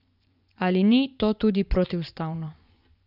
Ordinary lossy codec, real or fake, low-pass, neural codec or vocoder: none; fake; 5.4 kHz; vocoder, 24 kHz, 100 mel bands, Vocos